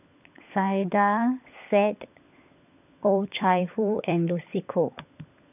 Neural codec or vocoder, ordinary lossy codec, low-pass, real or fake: codec, 16 kHz, 16 kbps, FunCodec, trained on LibriTTS, 50 frames a second; none; 3.6 kHz; fake